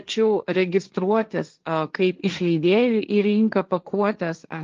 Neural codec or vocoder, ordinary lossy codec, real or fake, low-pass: codec, 16 kHz, 1.1 kbps, Voila-Tokenizer; Opus, 24 kbps; fake; 7.2 kHz